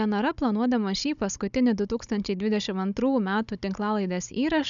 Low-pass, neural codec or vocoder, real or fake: 7.2 kHz; codec, 16 kHz, 16 kbps, FunCodec, trained on Chinese and English, 50 frames a second; fake